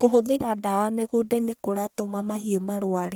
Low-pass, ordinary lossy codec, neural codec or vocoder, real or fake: none; none; codec, 44.1 kHz, 1.7 kbps, Pupu-Codec; fake